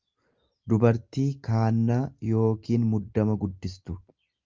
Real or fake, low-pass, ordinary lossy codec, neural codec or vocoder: real; 7.2 kHz; Opus, 24 kbps; none